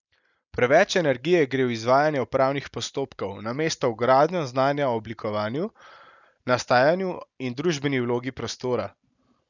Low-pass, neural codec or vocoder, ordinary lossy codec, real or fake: 7.2 kHz; none; none; real